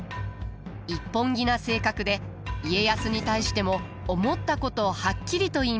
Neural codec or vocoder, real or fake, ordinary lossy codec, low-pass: none; real; none; none